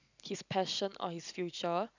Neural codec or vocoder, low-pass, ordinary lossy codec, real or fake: none; 7.2 kHz; none; real